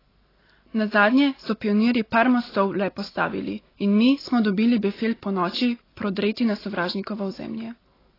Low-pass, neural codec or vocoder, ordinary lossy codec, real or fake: 5.4 kHz; none; AAC, 24 kbps; real